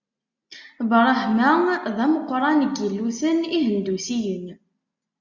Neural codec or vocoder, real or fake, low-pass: none; real; 7.2 kHz